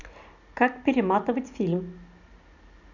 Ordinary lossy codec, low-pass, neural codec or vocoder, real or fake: none; 7.2 kHz; none; real